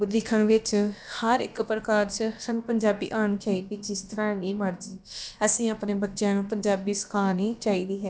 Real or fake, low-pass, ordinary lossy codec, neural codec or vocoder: fake; none; none; codec, 16 kHz, about 1 kbps, DyCAST, with the encoder's durations